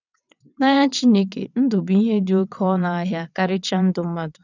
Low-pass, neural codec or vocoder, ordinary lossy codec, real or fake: 7.2 kHz; vocoder, 22.05 kHz, 80 mel bands, WaveNeXt; none; fake